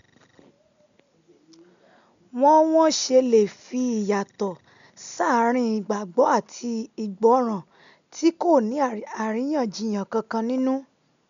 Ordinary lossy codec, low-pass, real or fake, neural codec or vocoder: none; 7.2 kHz; real; none